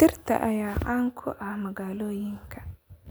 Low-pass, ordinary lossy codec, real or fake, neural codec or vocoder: none; none; real; none